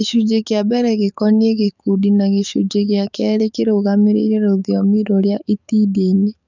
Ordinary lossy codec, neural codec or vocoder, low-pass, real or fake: none; codec, 44.1 kHz, 7.8 kbps, DAC; 7.2 kHz; fake